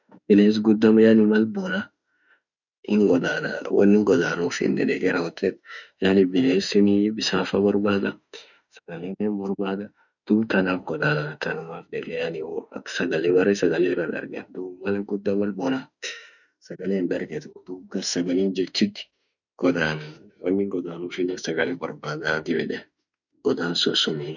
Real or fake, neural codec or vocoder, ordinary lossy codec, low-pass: fake; autoencoder, 48 kHz, 32 numbers a frame, DAC-VAE, trained on Japanese speech; none; 7.2 kHz